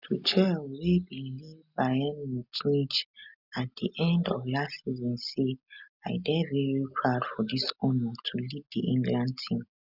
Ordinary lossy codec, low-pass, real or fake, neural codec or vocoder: none; 5.4 kHz; real; none